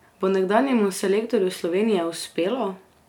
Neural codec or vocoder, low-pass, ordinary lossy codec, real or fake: none; 19.8 kHz; none; real